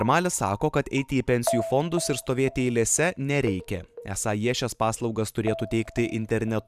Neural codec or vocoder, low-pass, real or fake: vocoder, 44.1 kHz, 128 mel bands every 512 samples, BigVGAN v2; 14.4 kHz; fake